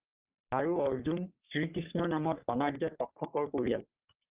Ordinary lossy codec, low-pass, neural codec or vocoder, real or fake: Opus, 32 kbps; 3.6 kHz; codec, 44.1 kHz, 3.4 kbps, Pupu-Codec; fake